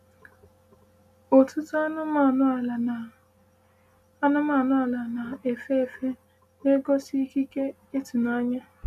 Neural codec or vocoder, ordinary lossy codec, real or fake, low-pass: none; none; real; 14.4 kHz